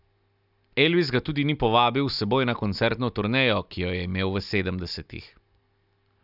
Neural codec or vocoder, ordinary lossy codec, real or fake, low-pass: none; none; real; 5.4 kHz